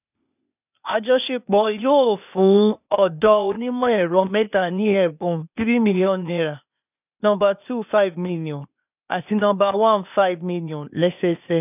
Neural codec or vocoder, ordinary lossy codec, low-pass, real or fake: codec, 16 kHz, 0.8 kbps, ZipCodec; none; 3.6 kHz; fake